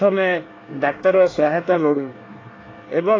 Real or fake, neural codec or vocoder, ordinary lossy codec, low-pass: fake; codec, 24 kHz, 1 kbps, SNAC; AAC, 32 kbps; 7.2 kHz